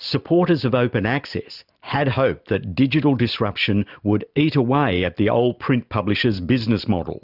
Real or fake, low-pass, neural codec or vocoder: real; 5.4 kHz; none